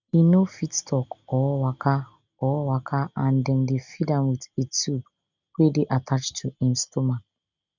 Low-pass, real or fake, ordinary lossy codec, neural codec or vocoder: 7.2 kHz; real; none; none